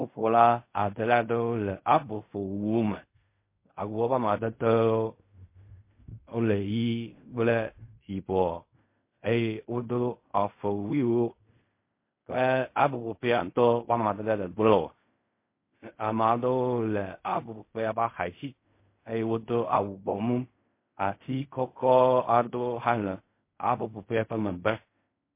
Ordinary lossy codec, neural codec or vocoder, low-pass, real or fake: MP3, 24 kbps; codec, 16 kHz in and 24 kHz out, 0.4 kbps, LongCat-Audio-Codec, fine tuned four codebook decoder; 3.6 kHz; fake